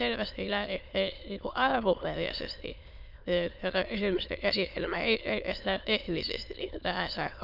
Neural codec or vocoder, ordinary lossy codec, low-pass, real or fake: autoencoder, 22.05 kHz, a latent of 192 numbers a frame, VITS, trained on many speakers; none; 5.4 kHz; fake